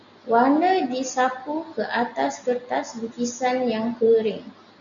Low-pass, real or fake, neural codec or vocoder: 7.2 kHz; real; none